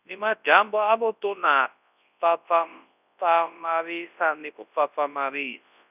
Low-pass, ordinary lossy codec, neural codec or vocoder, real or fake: 3.6 kHz; none; codec, 24 kHz, 0.9 kbps, WavTokenizer, large speech release; fake